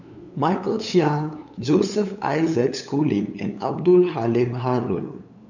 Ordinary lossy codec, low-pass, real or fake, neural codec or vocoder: none; 7.2 kHz; fake; codec, 16 kHz, 8 kbps, FunCodec, trained on LibriTTS, 25 frames a second